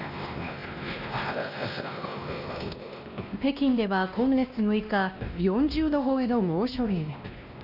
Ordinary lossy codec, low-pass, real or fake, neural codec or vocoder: none; 5.4 kHz; fake; codec, 16 kHz, 1 kbps, X-Codec, WavLM features, trained on Multilingual LibriSpeech